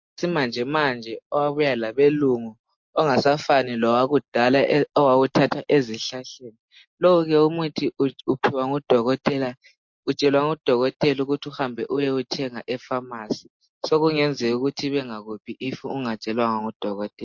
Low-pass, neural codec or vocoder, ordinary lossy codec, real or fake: 7.2 kHz; none; MP3, 48 kbps; real